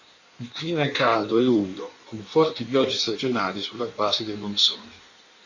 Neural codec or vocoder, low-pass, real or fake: codec, 16 kHz in and 24 kHz out, 1.1 kbps, FireRedTTS-2 codec; 7.2 kHz; fake